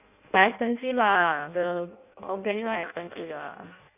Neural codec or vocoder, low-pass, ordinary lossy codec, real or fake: codec, 16 kHz in and 24 kHz out, 0.6 kbps, FireRedTTS-2 codec; 3.6 kHz; none; fake